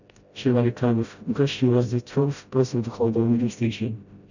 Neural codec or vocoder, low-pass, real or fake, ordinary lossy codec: codec, 16 kHz, 0.5 kbps, FreqCodec, smaller model; 7.2 kHz; fake; none